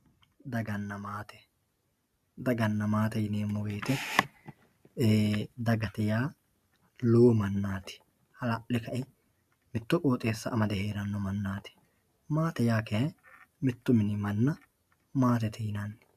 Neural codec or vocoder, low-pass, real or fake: none; 14.4 kHz; real